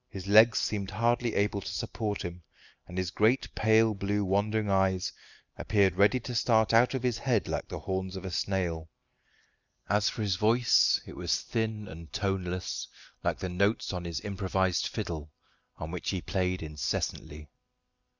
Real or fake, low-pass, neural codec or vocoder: real; 7.2 kHz; none